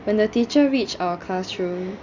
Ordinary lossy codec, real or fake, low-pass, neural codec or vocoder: none; real; 7.2 kHz; none